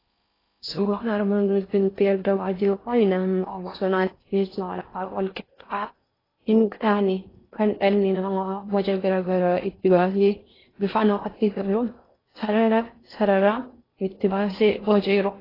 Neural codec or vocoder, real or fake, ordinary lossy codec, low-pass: codec, 16 kHz in and 24 kHz out, 0.6 kbps, FocalCodec, streaming, 4096 codes; fake; AAC, 24 kbps; 5.4 kHz